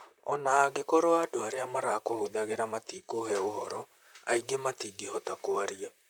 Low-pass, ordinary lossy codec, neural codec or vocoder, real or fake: none; none; vocoder, 44.1 kHz, 128 mel bands, Pupu-Vocoder; fake